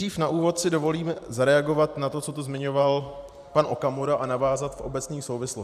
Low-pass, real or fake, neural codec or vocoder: 14.4 kHz; fake; vocoder, 44.1 kHz, 128 mel bands every 512 samples, BigVGAN v2